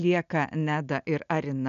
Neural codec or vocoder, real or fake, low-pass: none; real; 7.2 kHz